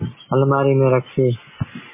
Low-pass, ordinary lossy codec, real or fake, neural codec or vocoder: 3.6 kHz; MP3, 16 kbps; real; none